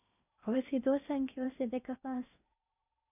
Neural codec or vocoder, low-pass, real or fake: codec, 16 kHz in and 24 kHz out, 0.8 kbps, FocalCodec, streaming, 65536 codes; 3.6 kHz; fake